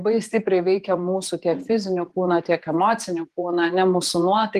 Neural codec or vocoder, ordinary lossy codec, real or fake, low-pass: vocoder, 48 kHz, 128 mel bands, Vocos; Opus, 24 kbps; fake; 14.4 kHz